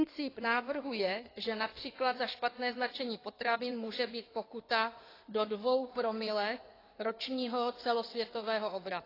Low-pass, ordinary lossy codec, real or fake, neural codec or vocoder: 5.4 kHz; AAC, 24 kbps; fake; codec, 16 kHz in and 24 kHz out, 2.2 kbps, FireRedTTS-2 codec